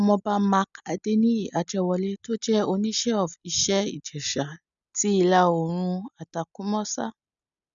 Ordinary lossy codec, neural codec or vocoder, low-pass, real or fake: none; none; 7.2 kHz; real